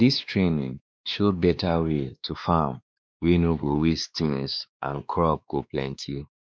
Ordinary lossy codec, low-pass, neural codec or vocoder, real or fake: none; none; codec, 16 kHz, 2 kbps, X-Codec, WavLM features, trained on Multilingual LibriSpeech; fake